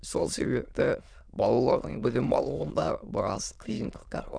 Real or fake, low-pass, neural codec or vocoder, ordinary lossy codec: fake; 9.9 kHz; autoencoder, 22.05 kHz, a latent of 192 numbers a frame, VITS, trained on many speakers; none